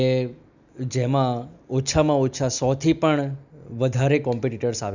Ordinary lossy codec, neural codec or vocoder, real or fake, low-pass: none; none; real; 7.2 kHz